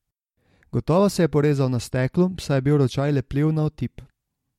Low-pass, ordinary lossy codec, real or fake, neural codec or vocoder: 19.8 kHz; MP3, 64 kbps; real; none